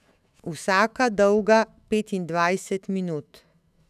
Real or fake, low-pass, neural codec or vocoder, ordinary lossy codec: fake; 14.4 kHz; autoencoder, 48 kHz, 128 numbers a frame, DAC-VAE, trained on Japanese speech; none